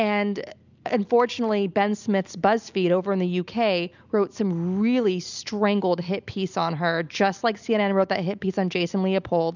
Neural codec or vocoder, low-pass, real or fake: none; 7.2 kHz; real